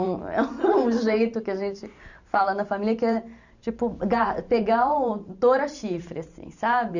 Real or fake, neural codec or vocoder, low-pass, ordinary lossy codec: fake; vocoder, 44.1 kHz, 128 mel bands every 512 samples, BigVGAN v2; 7.2 kHz; none